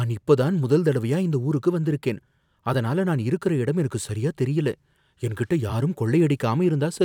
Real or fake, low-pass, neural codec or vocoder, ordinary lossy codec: fake; 19.8 kHz; vocoder, 44.1 kHz, 128 mel bands every 256 samples, BigVGAN v2; none